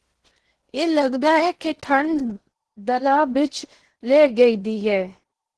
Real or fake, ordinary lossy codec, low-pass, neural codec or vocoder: fake; Opus, 16 kbps; 10.8 kHz; codec, 16 kHz in and 24 kHz out, 0.8 kbps, FocalCodec, streaming, 65536 codes